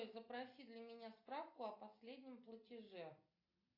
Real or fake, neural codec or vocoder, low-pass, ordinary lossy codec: real; none; 5.4 kHz; AAC, 32 kbps